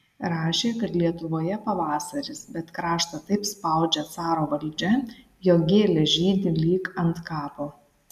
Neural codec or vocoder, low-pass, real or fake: none; 14.4 kHz; real